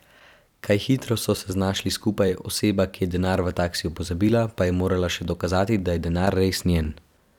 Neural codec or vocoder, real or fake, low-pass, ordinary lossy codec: vocoder, 44.1 kHz, 128 mel bands every 256 samples, BigVGAN v2; fake; 19.8 kHz; none